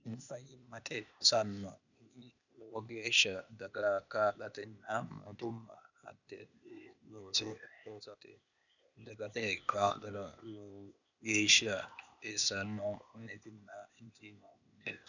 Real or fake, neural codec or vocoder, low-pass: fake; codec, 16 kHz, 0.8 kbps, ZipCodec; 7.2 kHz